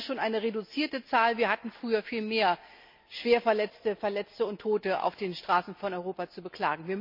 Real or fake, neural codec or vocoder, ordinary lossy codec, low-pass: real; none; MP3, 48 kbps; 5.4 kHz